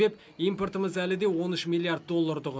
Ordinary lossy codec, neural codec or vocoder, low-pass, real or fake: none; none; none; real